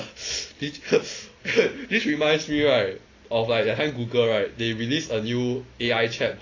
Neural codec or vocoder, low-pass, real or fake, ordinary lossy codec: none; 7.2 kHz; real; AAC, 32 kbps